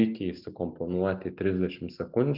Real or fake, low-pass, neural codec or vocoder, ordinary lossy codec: real; 5.4 kHz; none; Opus, 32 kbps